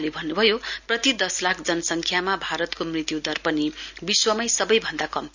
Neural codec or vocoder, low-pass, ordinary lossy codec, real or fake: none; 7.2 kHz; none; real